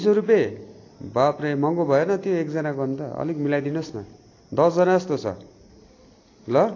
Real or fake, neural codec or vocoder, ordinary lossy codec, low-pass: real; none; AAC, 32 kbps; 7.2 kHz